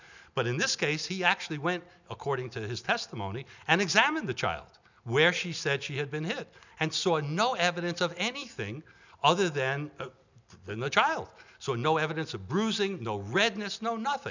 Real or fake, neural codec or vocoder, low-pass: real; none; 7.2 kHz